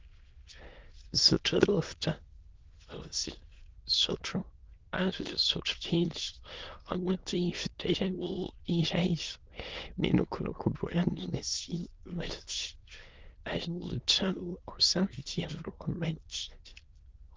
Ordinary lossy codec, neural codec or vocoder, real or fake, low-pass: Opus, 16 kbps; autoencoder, 22.05 kHz, a latent of 192 numbers a frame, VITS, trained on many speakers; fake; 7.2 kHz